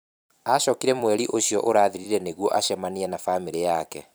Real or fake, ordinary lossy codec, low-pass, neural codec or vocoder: fake; none; none; vocoder, 44.1 kHz, 128 mel bands every 256 samples, BigVGAN v2